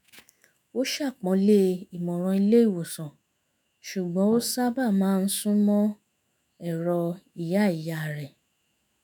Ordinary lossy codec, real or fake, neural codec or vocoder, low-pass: none; fake; autoencoder, 48 kHz, 128 numbers a frame, DAC-VAE, trained on Japanese speech; none